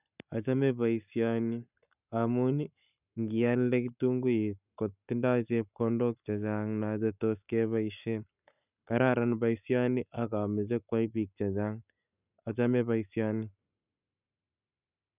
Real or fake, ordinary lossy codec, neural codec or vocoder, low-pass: fake; none; codec, 44.1 kHz, 7.8 kbps, Pupu-Codec; 3.6 kHz